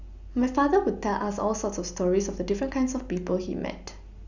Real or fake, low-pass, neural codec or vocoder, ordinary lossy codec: real; 7.2 kHz; none; none